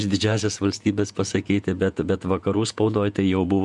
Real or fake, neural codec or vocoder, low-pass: fake; vocoder, 48 kHz, 128 mel bands, Vocos; 10.8 kHz